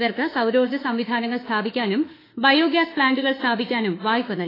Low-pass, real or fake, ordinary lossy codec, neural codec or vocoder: 5.4 kHz; fake; AAC, 24 kbps; autoencoder, 48 kHz, 32 numbers a frame, DAC-VAE, trained on Japanese speech